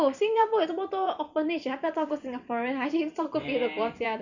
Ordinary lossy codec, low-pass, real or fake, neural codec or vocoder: none; 7.2 kHz; fake; vocoder, 44.1 kHz, 128 mel bands every 256 samples, BigVGAN v2